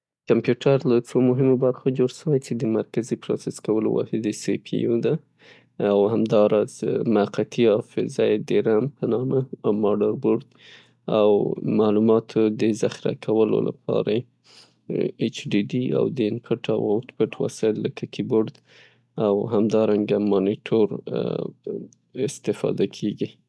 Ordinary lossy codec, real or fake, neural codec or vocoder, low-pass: none; real; none; none